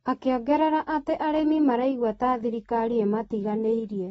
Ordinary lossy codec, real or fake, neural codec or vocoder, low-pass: AAC, 24 kbps; real; none; 19.8 kHz